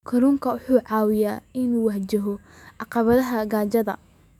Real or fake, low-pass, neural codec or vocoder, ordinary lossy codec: fake; 19.8 kHz; autoencoder, 48 kHz, 128 numbers a frame, DAC-VAE, trained on Japanese speech; none